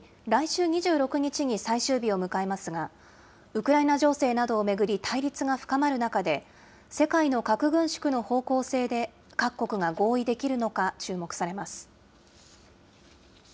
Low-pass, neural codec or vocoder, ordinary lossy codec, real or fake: none; none; none; real